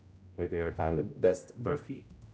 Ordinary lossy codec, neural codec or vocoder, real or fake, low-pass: none; codec, 16 kHz, 0.5 kbps, X-Codec, HuBERT features, trained on general audio; fake; none